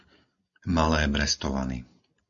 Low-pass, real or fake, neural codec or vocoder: 7.2 kHz; real; none